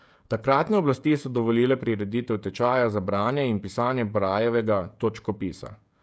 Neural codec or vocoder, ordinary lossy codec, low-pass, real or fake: codec, 16 kHz, 16 kbps, FreqCodec, smaller model; none; none; fake